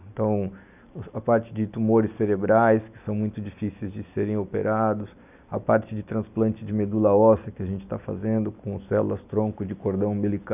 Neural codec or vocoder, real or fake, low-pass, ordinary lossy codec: none; real; 3.6 kHz; none